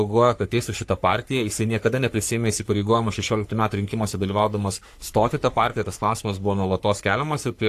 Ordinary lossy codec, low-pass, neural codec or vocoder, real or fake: AAC, 64 kbps; 14.4 kHz; codec, 44.1 kHz, 3.4 kbps, Pupu-Codec; fake